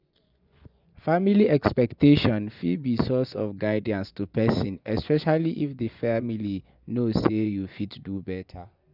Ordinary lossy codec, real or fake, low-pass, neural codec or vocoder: none; fake; 5.4 kHz; vocoder, 24 kHz, 100 mel bands, Vocos